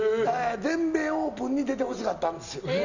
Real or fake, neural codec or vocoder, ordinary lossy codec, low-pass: real; none; none; 7.2 kHz